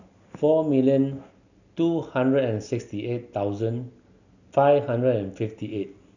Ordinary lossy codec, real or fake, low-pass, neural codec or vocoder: none; real; 7.2 kHz; none